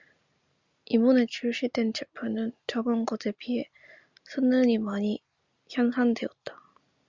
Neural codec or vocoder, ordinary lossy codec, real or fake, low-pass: none; Opus, 64 kbps; real; 7.2 kHz